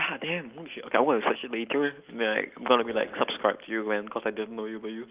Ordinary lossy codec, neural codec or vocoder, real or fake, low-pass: Opus, 32 kbps; none; real; 3.6 kHz